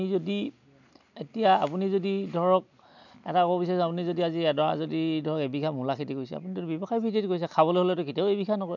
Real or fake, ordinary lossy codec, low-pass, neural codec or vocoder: real; none; 7.2 kHz; none